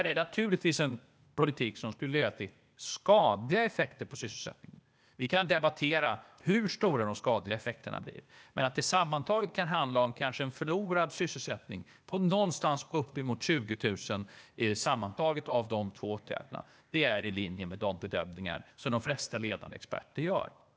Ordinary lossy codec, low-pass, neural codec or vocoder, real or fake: none; none; codec, 16 kHz, 0.8 kbps, ZipCodec; fake